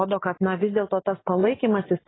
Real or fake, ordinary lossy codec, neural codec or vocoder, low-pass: real; AAC, 16 kbps; none; 7.2 kHz